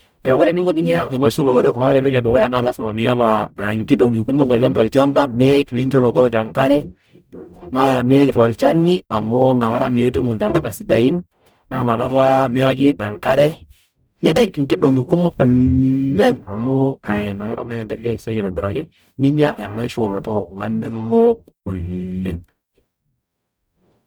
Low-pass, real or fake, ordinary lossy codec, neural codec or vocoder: none; fake; none; codec, 44.1 kHz, 0.9 kbps, DAC